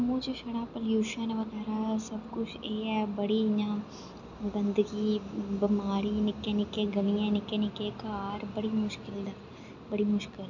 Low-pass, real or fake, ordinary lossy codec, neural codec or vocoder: 7.2 kHz; real; none; none